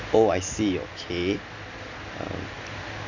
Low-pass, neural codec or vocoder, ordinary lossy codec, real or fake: 7.2 kHz; none; none; real